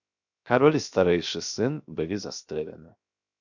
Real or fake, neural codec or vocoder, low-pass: fake; codec, 16 kHz, 0.7 kbps, FocalCodec; 7.2 kHz